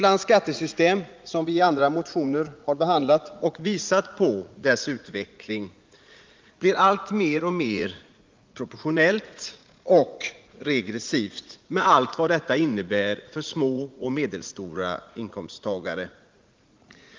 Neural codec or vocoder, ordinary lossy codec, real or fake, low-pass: vocoder, 44.1 kHz, 80 mel bands, Vocos; Opus, 24 kbps; fake; 7.2 kHz